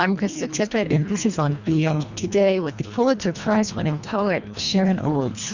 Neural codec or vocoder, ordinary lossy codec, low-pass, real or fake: codec, 24 kHz, 1.5 kbps, HILCodec; Opus, 64 kbps; 7.2 kHz; fake